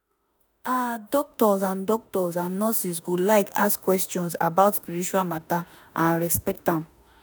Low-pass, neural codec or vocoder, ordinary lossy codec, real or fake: none; autoencoder, 48 kHz, 32 numbers a frame, DAC-VAE, trained on Japanese speech; none; fake